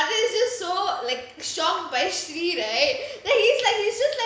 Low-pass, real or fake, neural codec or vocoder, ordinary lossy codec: none; real; none; none